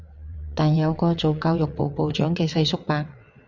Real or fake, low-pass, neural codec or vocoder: fake; 7.2 kHz; vocoder, 22.05 kHz, 80 mel bands, WaveNeXt